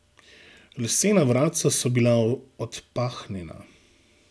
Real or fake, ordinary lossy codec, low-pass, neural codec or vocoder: real; none; none; none